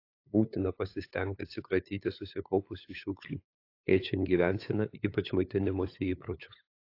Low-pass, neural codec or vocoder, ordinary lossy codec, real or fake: 5.4 kHz; codec, 16 kHz, 8 kbps, FunCodec, trained on LibriTTS, 25 frames a second; AAC, 32 kbps; fake